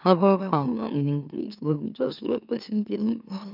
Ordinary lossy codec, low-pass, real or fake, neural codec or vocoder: none; 5.4 kHz; fake; autoencoder, 44.1 kHz, a latent of 192 numbers a frame, MeloTTS